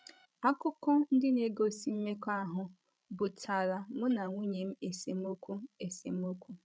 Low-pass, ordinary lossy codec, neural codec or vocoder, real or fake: none; none; codec, 16 kHz, 16 kbps, FreqCodec, larger model; fake